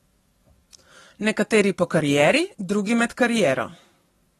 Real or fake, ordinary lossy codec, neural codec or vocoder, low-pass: fake; AAC, 32 kbps; codec, 44.1 kHz, 7.8 kbps, DAC; 19.8 kHz